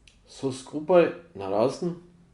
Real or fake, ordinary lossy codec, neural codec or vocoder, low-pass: real; Opus, 64 kbps; none; 10.8 kHz